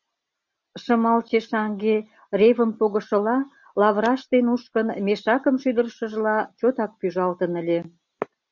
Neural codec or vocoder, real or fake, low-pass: none; real; 7.2 kHz